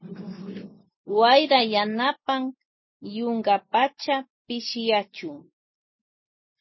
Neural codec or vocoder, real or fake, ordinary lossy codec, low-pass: none; real; MP3, 24 kbps; 7.2 kHz